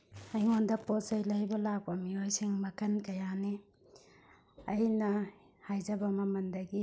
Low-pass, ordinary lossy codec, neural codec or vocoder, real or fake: none; none; none; real